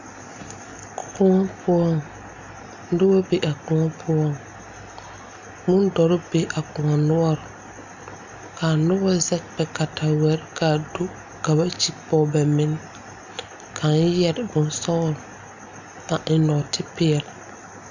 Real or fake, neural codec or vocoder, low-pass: real; none; 7.2 kHz